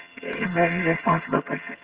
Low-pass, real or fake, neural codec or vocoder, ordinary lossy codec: 3.6 kHz; fake; vocoder, 22.05 kHz, 80 mel bands, HiFi-GAN; Opus, 16 kbps